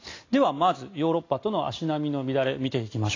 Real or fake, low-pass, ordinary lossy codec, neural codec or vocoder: real; 7.2 kHz; AAC, 32 kbps; none